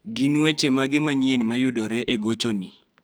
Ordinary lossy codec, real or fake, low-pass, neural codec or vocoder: none; fake; none; codec, 44.1 kHz, 2.6 kbps, SNAC